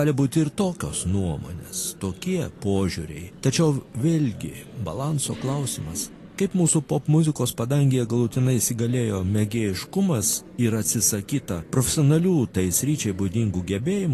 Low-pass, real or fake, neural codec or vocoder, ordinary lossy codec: 14.4 kHz; real; none; AAC, 48 kbps